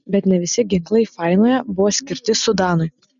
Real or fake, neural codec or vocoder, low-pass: real; none; 7.2 kHz